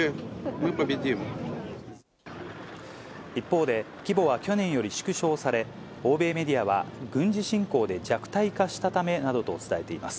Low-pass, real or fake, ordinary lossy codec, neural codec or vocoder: none; real; none; none